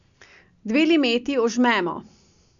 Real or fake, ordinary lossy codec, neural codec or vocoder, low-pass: real; none; none; 7.2 kHz